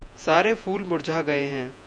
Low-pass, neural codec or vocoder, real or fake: 9.9 kHz; vocoder, 48 kHz, 128 mel bands, Vocos; fake